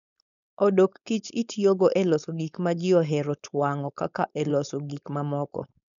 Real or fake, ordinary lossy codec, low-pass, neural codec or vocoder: fake; none; 7.2 kHz; codec, 16 kHz, 4.8 kbps, FACodec